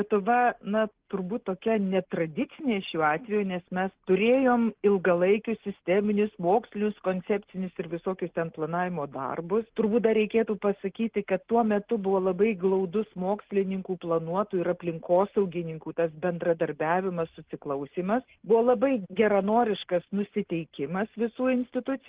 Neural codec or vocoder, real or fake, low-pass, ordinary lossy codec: none; real; 3.6 kHz; Opus, 16 kbps